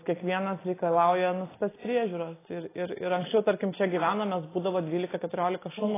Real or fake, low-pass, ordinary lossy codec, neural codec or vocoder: real; 3.6 kHz; AAC, 16 kbps; none